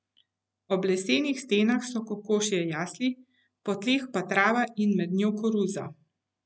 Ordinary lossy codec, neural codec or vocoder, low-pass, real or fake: none; none; none; real